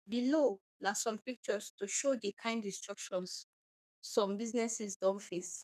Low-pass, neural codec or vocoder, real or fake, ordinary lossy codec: 14.4 kHz; codec, 32 kHz, 1.9 kbps, SNAC; fake; none